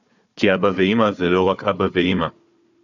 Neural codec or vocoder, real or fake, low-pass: codec, 16 kHz, 4 kbps, FunCodec, trained on Chinese and English, 50 frames a second; fake; 7.2 kHz